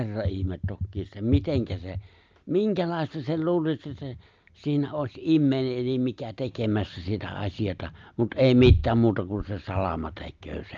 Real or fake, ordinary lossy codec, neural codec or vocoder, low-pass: real; Opus, 32 kbps; none; 7.2 kHz